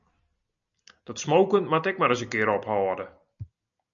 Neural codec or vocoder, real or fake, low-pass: none; real; 7.2 kHz